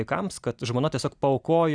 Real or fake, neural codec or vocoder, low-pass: real; none; 9.9 kHz